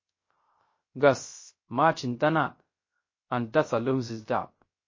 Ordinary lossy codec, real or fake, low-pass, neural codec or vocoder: MP3, 32 kbps; fake; 7.2 kHz; codec, 16 kHz, 0.3 kbps, FocalCodec